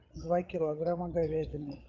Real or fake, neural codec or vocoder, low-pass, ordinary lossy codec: fake; codec, 16 kHz, 8 kbps, FreqCodec, larger model; 7.2 kHz; Opus, 24 kbps